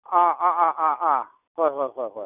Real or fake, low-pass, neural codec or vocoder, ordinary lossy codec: real; 3.6 kHz; none; none